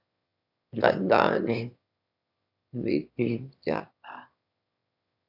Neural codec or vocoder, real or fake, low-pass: autoencoder, 22.05 kHz, a latent of 192 numbers a frame, VITS, trained on one speaker; fake; 5.4 kHz